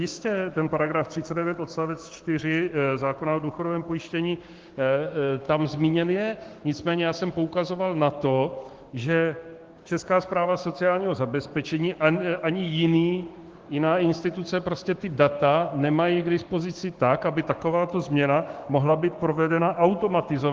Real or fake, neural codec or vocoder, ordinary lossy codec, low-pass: fake; codec, 16 kHz, 6 kbps, DAC; Opus, 16 kbps; 7.2 kHz